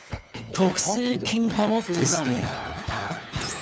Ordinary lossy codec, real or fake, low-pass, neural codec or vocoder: none; fake; none; codec, 16 kHz, 4 kbps, FunCodec, trained on LibriTTS, 50 frames a second